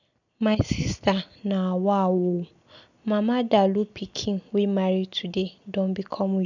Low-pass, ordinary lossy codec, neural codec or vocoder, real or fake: 7.2 kHz; none; none; real